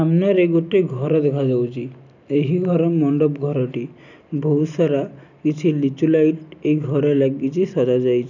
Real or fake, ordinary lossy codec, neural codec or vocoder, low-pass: real; none; none; 7.2 kHz